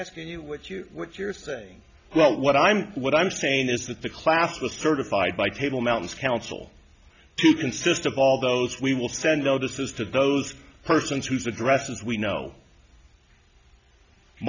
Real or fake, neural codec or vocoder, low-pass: real; none; 7.2 kHz